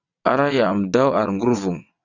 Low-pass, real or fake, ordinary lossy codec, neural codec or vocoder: 7.2 kHz; fake; Opus, 64 kbps; vocoder, 22.05 kHz, 80 mel bands, WaveNeXt